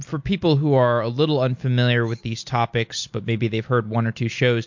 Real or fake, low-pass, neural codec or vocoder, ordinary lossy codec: real; 7.2 kHz; none; MP3, 48 kbps